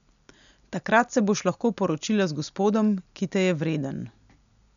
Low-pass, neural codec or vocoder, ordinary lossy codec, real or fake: 7.2 kHz; none; none; real